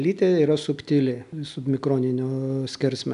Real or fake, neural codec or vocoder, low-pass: real; none; 10.8 kHz